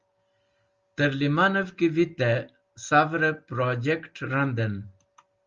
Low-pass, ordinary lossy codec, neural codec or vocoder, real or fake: 7.2 kHz; Opus, 32 kbps; none; real